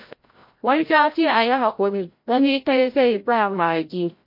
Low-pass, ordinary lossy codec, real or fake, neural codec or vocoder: 5.4 kHz; MP3, 32 kbps; fake; codec, 16 kHz, 0.5 kbps, FreqCodec, larger model